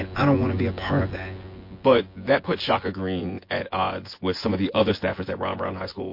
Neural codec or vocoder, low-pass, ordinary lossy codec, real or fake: vocoder, 24 kHz, 100 mel bands, Vocos; 5.4 kHz; MP3, 32 kbps; fake